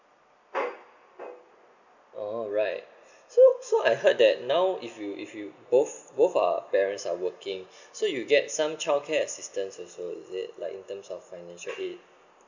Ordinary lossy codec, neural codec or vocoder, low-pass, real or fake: none; none; 7.2 kHz; real